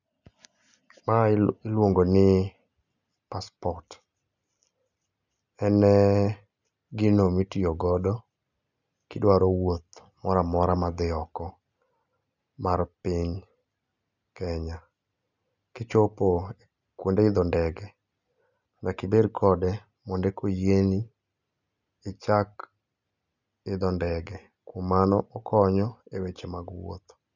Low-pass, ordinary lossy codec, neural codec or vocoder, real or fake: 7.2 kHz; none; none; real